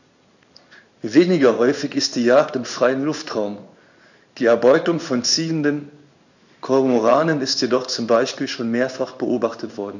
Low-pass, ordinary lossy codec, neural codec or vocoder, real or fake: 7.2 kHz; none; codec, 16 kHz in and 24 kHz out, 1 kbps, XY-Tokenizer; fake